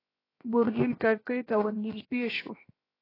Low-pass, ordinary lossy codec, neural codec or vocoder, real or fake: 5.4 kHz; AAC, 24 kbps; codec, 16 kHz, 0.7 kbps, FocalCodec; fake